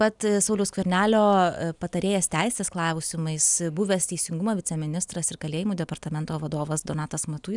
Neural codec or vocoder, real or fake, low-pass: none; real; 10.8 kHz